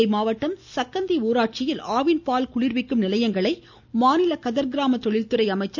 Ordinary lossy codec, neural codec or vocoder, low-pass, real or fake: none; none; none; real